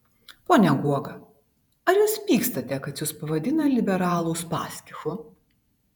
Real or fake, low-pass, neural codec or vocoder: fake; 19.8 kHz; vocoder, 48 kHz, 128 mel bands, Vocos